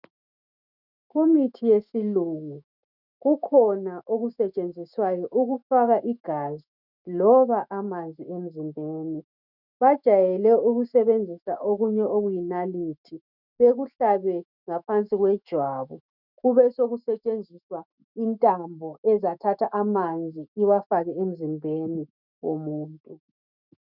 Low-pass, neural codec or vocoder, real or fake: 5.4 kHz; autoencoder, 48 kHz, 128 numbers a frame, DAC-VAE, trained on Japanese speech; fake